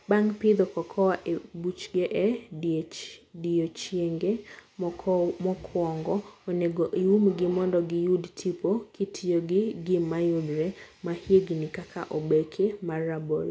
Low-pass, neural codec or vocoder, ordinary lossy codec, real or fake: none; none; none; real